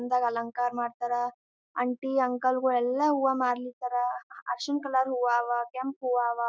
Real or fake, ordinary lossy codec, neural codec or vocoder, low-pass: real; none; none; none